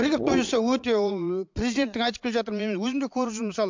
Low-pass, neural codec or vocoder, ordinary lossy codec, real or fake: 7.2 kHz; vocoder, 22.05 kHz, 80 mel bands, Vocos; MP3, 64 kbps; fake